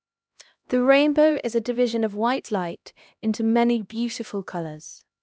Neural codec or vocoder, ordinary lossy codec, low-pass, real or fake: codec, 16 kHz, 1 kbps, X-Codec, HuBERT features, trained on LibriSpeech; none; none; fake